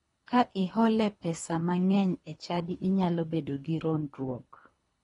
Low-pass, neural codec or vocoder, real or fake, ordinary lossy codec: 10.8 kHz; codec, 24 kHz, 3 kbps, HILCodec; fake; AAC, 32 kbps